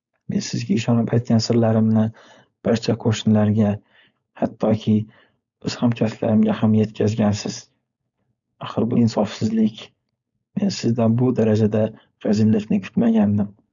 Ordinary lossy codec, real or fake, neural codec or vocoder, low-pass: none; fake; codec, 16 kHz, 4.8 kbps, FACodec; 7.2 kHz